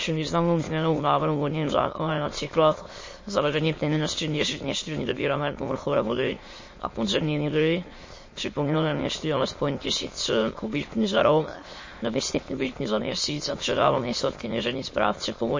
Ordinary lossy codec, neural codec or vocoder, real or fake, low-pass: MP3, 32 kbps; autoencoder, 22.05 kHz, a latent of 192 numbers a frame, VITS, trained on many speakers; fake; 7.2 kHz